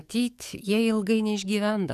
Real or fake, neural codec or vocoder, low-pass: fake; codec, 44.1 kHz, 7.8 kbps, DAC; 14.4 kHz